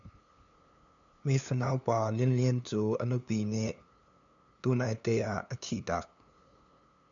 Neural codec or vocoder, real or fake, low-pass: codec, 16 kHz, 2 kbps, FunCodec, trained on LibriTTS, 25 frames a second; fake; 7.2 kHz